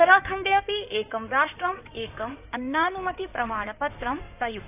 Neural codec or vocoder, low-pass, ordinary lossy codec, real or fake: codec, 16 kHz in and 24 kHz out, 2.2 kbps, FireRedTTS-2 codec; 3.6 kHz; AAC, 32 kbps; fake